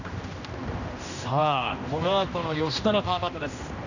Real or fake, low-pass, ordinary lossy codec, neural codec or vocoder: fake; 7.2 kHz; none; codec, 16 kHz, 1 kbps, X-Codec, HuBERT features, trained on general audio